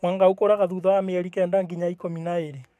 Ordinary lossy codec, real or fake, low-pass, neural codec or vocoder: none; fake; 14.4 kHz; codec, 44.1 kHz, 7.8 kbps, DAC